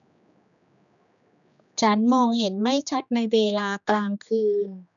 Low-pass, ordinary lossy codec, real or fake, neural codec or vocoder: 7.2 kHz; none; fake; codec, 16 kHz, 2 kbps, X-Codec, HuBERT features, trained on general audio